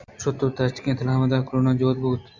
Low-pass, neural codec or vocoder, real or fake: 7.2 kHz; none; real